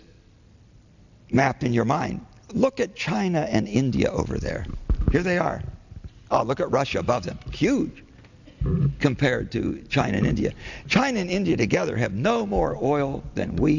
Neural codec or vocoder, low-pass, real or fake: none; 7.2 kHz; real